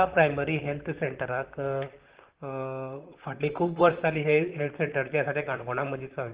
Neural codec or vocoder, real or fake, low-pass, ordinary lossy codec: vocoder, 44.1 kHz, 128 mel bands, Pupu-Vocoder; fake; 3.6 kHz; Opus, 16 kbps